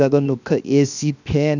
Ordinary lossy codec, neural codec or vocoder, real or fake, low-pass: none; codec, 16 kHz, 0.7 kbps, FocalCodec; fake; 7.2 kHz